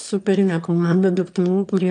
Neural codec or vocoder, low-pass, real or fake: autoencoder, 22.05 kHz, a latent of 192 numbers a frame, VITS, trained on one speaker; 9.9 kHz; fake